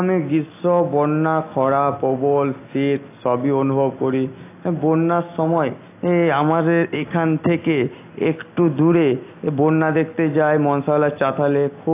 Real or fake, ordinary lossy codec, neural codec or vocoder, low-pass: real; AAC, 24 kbps; none; 3.6 kHz